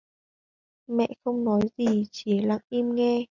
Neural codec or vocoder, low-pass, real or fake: none; 7.2 kHz; real